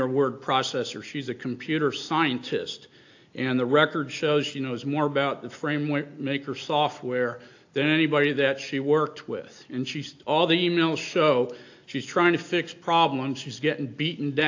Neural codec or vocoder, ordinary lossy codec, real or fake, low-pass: none; AAC, 48 kbps; real; 7.2 kHz